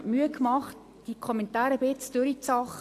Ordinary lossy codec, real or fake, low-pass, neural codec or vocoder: AAC, 64 kbps; real; 14.4 kHz; none